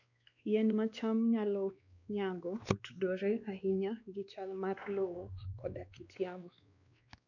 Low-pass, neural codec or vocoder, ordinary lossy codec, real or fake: 7.2 kHz; codec, 16 kHz, 2 kbps, X-Codec, WavLM features, trained on Multilingual LibriSpeech; none; fake